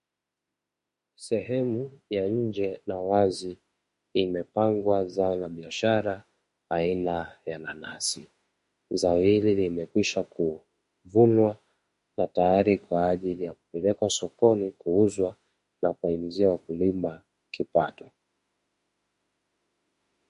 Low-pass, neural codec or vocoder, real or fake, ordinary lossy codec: 14.4 kHz; autoencoder, 48 kHz, 32 numbers a frame, DAC-VAE, trained on Japanese speech; fake; MP3, 48 kbps